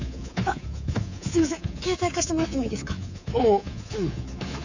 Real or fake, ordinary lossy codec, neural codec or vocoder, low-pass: fake; Opus, 64 kbps; codec, 24 kHz, 3.1 kbps, DualCodec; 7.2 kHz